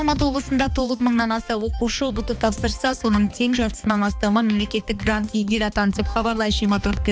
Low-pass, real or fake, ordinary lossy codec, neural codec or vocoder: none; fake; none; codec, 16 kHz, 2 kbps, X-Codec, HuBERT features, trained on balanced general audio